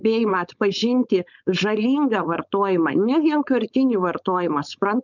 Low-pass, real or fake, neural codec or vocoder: 7.2 kHz; fake; codec, 16 kHz, 4.8 kbps, FACodec